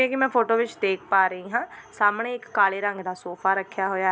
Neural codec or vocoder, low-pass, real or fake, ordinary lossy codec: none; none; real; none